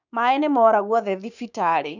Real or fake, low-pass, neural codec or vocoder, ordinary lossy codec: fake; 7.2 kHz; codec, 16 kHz, 6 kbps, DAC; none